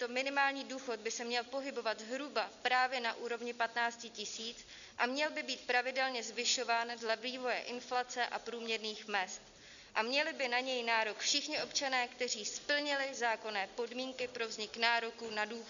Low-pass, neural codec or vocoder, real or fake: 7.2 kHz; none; real